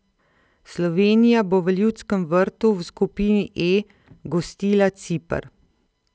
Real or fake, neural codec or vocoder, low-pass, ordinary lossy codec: real; none; none; none